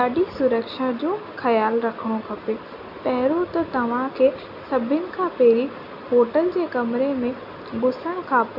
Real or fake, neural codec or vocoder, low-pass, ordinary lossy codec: real; none; 5.4 kHz; none